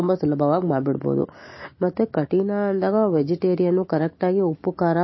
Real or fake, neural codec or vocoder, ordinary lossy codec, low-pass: real; none; MP3, 24 kbps; 7.2 kHz